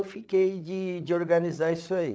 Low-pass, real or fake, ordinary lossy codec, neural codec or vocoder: none; fake; none; codec, 16 kHz, 8 kbps, FreqCodec, larger model